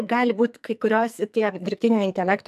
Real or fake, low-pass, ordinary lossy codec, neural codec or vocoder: fake; 14.4 kHz; AAC, 96 kbps; codec, 44.1 kHz, 2.6 kbps, SNAC